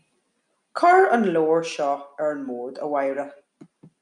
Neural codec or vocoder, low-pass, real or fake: none; 10.8 kHz; real